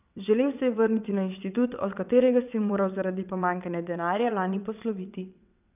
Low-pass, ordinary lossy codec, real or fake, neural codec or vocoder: 3.6 kHz; none; fake; vocoder, 22.05 kHz, 80 mel bands, WaveNeXt